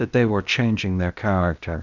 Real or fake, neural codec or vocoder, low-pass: fake; codec, 16 kHz, 0.8 kbps, ZipCodec; 7.2 kHz